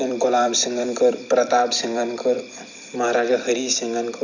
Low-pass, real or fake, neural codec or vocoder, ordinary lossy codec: 7.2 kHz; fake; vocoder, 44.1 kHz, 128 mel bands every 256 samples, BigVGAN v2; none